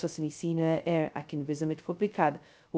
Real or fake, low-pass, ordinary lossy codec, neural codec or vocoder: fake; none; none; codec, 16 kHz, 0.2 kbps, FocalCodec